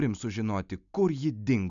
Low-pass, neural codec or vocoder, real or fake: 7.2 kHz; none; real